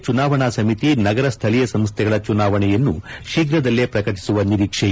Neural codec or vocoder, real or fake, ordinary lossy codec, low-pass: none; real; none; none